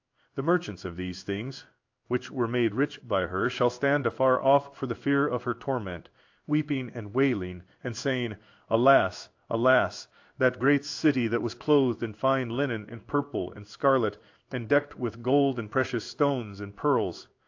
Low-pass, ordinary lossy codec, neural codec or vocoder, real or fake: 7.2 kHz; AAC, 48 kbps; codec, 16 kHz in and 24 kHz out, 1 kbps, XY-Tokenizer; fake